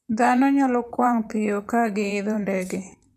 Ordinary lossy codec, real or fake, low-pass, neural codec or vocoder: none; fake; 14.4 kHz; vocoder, 44.1 kHz, 128 mel bands, Pupu-Vocoder